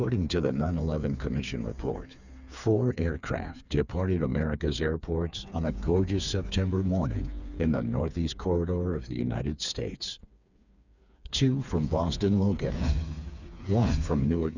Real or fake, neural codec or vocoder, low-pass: fake; codec, 16 kHz, 4 kbps, FreqCodec, smaller model; 7.2 kHz